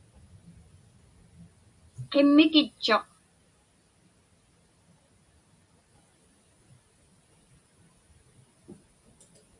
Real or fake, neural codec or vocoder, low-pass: real; none; 10.8 kHz